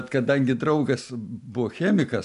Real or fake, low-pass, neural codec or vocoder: real; 10.8 kHz; none